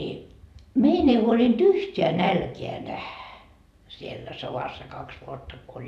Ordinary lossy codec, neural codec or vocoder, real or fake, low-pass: none; vocoder, 44.1 kHz, 128 mel bands every 512 samples, BigVGAN v2; fake; 14.4 kHz